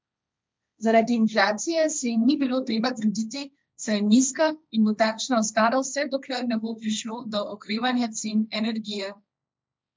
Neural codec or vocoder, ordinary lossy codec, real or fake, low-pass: codec, 16 kHz, 1.1 kbps, Voila-Tokenizer; none; fake; none